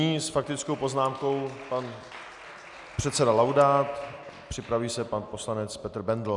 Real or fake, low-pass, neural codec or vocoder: real; 10.8 kHz; none